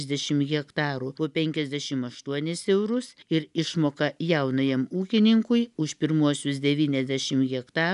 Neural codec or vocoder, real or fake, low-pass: none; real; 10.8 kHz